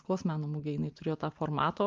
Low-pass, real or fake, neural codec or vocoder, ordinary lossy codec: 7.2 kHz; real; none; Opus, 32 kbps